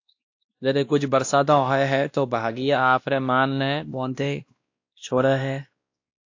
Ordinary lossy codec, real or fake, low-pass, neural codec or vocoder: AAC, 48 kbps; fake; 7.2 kHz; codec, 16 kHz, 1 kbps, X-Codec, WavLM features, trained on Multilingual LibriSpeech